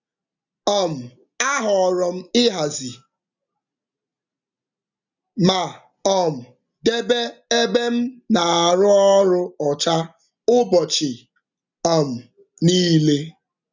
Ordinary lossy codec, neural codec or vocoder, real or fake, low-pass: none; none; real; 7.2 kHz